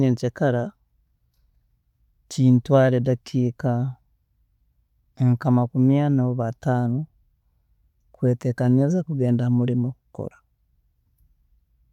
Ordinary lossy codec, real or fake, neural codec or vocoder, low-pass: none; real; none; 19.8 kHz